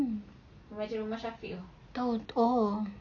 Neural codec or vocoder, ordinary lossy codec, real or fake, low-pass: none; none; real; 7.2 kHz